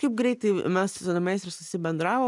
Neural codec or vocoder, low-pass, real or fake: none; 10.8 kHz; real